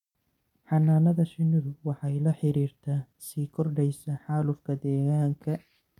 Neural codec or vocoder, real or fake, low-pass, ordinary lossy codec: none; real; 19.8 kHz; none